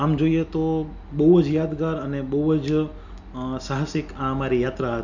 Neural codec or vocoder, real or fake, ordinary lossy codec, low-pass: none; real; none; 7.2 kHz